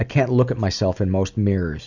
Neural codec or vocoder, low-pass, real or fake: none; 7.2 kHz; real